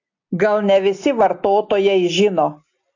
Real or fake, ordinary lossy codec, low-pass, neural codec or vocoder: real; AAC, 48 kbps; 7.2 kHz; none